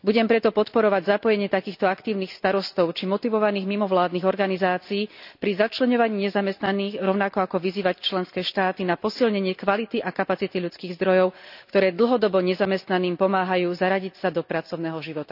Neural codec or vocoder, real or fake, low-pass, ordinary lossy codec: none; real; 5.4 kHz; none